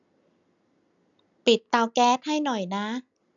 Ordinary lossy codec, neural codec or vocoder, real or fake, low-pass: none; none; real; 7.2 kHz